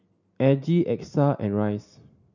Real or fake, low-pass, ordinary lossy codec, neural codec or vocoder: real; 7.2 kHz; none; none